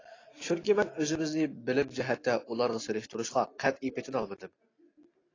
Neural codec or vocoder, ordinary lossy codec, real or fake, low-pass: none; AAC, 32 kbps; real; 7.2 kHz